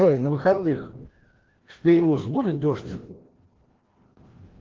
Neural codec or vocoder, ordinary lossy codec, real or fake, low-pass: codec, 16 kHz, 1 kbps, FreqCodec, larger model; Opus, 16 kbps; fake; 7.2 kHz